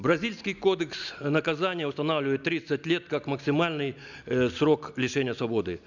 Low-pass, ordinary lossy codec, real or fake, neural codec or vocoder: 7.2 kHz; none; real; none